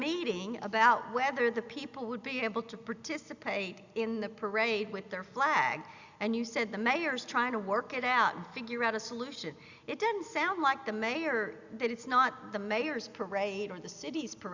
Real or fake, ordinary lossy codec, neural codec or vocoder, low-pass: real; Opus, 64 kbps; none; 7.2 kHz